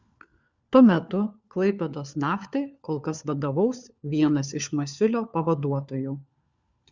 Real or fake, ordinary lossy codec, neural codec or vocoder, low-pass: fake; Opus, 64 kbps; codec, 16 kHz, 4 kbps, FunCodec, trained on LibriTTS, 50 frames a second; 7.2 kHz